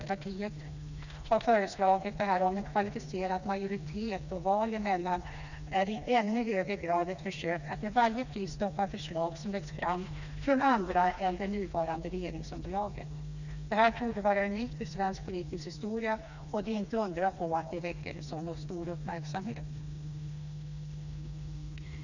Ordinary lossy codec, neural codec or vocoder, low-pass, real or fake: none; codec, 16 kHz, 2 kbps, FreqCodec, smaller model; 7.2 kHz; fake